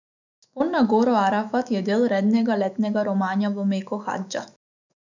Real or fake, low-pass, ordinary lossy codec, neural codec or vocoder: fake; 7.2 kHz; none; autoencoder, 48 kHz, 128 numbers a frame, DAC-VAE, trained on Japanese speech